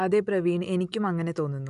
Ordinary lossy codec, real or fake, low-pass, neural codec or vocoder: none; real; 10.8 kHz; none